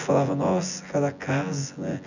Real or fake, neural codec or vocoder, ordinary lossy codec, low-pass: fake; vocoder, 24 kHz, 100 mel bands, Vocos; none; 7.2 kHz